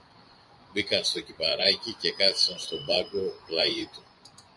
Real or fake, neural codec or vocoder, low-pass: fake; vocoder, 24 kHz, 100 mel bands, Vocos; 10.8 kHz